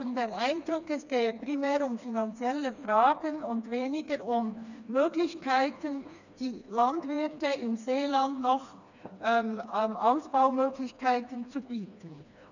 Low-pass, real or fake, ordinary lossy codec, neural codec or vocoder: 7.2 kHz; fake; none; codec, 16 kHz, 2 kbps, FreqCodec, smaller model